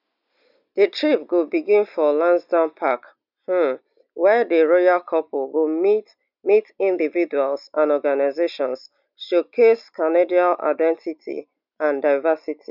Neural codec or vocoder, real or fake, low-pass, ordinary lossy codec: none; real; 5.4 kHz; none